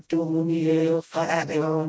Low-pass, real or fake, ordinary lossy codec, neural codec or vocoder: none; fake; none; codec, 16 kHz, 0.5 kbps, FreqCodec, smaller model